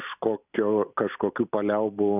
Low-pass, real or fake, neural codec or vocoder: 3.6 kHz; real; none